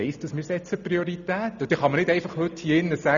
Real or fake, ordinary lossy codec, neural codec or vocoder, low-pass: real; none; none; 7.2 kHz